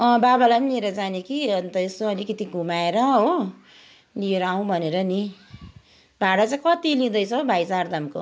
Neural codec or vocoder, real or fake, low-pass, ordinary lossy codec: none; real; none; none